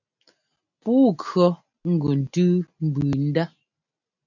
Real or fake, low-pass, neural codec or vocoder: real; 7.2 kHz; none